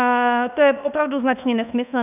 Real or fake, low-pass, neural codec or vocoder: fake; 3.6 kHz; codec, 24 kHz, 1.2 kbps, DualCodec